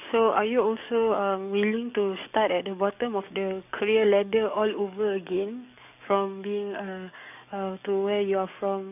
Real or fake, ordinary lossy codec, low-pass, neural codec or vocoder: fake; none; 3.6 kHz; codec, 44.1 kHz, 7.8 kbps, DAC